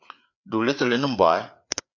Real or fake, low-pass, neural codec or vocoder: fake; 7.2 kHz; codec, 16 kHz, 4 kbps, FreqCodec, larger model